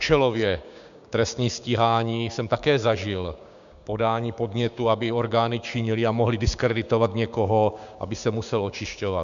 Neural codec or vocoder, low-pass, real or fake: codec, 16 kHz, 6 kbps, DAC; 7.2 kHz; fake